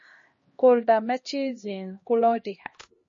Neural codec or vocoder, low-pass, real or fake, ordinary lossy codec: codec, 16 kHz, 1 kbps, X-Codec, HuBERT features, trained on LibriSpeech; 7.2 kHz; fake; MP3, 32 kbps